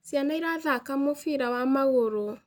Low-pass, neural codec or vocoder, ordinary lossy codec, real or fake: none; none; none; real